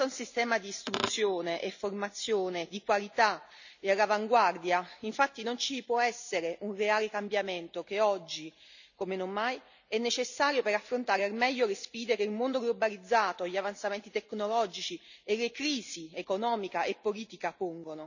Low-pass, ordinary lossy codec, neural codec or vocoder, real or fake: 7.2 kHz; none; none; real